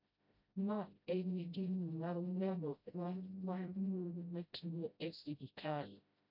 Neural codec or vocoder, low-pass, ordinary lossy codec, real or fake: codec, 16 kHz, 0.5 kbps, FreqCodec, smaller model; 5.4 kHz; none; fake